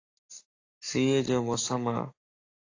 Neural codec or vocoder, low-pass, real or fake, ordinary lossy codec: codec, 44.1 kHz, 7.8 kbps, Pupu-Codec; 7.2 kHz; fake; AAC, 48 kbps